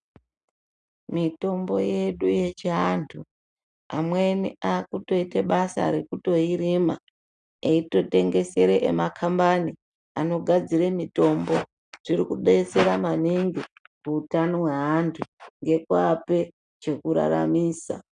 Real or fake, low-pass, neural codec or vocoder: real; 9.9 kHz; none